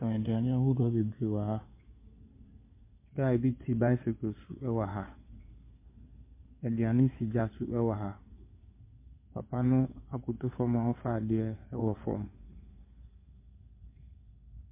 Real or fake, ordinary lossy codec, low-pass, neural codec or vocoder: fake; MP3, 24 kbps; 3.6 kHz; codec, 16 kHz in and 24 kHz out, 2.2 kbps, FireRedTTS-2 codec